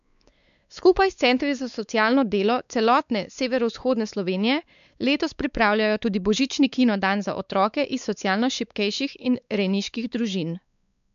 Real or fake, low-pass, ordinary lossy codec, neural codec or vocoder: fake; 7.2 kHz; none; codec, 16 kHz, 4 kbps, X-Codec, WavLM features, trained on Multilingual LibriSpeech